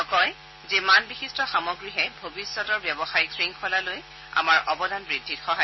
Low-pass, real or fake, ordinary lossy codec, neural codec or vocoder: 7.2 kHz; real; MP3, 24 kbps; none